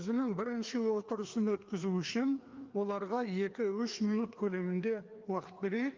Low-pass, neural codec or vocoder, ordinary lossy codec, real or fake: 7.2 kHz; codec, 16 kHz, 2 kbps, FreqCodec, larger model; Opus, 32 kbps; fake